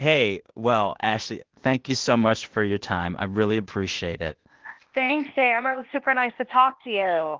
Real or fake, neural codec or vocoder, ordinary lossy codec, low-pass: fake; codec, 16 kHz, 0.8 kbps, ZipCodec; Opus, 16 kbps; 7.2 kHz